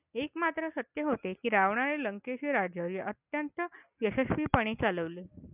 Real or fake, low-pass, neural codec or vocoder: real; 3.6 kHz; none